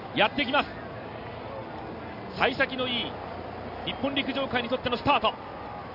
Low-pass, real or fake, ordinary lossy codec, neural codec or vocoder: 5.4 kHz; real; none; none